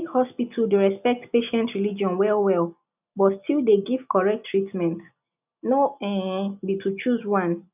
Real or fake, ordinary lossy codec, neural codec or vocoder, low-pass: real; none; none; 3.6 kHz